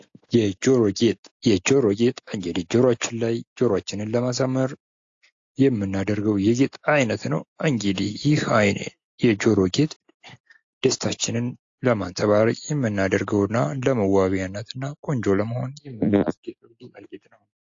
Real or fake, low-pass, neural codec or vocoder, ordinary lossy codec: real; 7.2 kHz; none; AAC, 48 kbps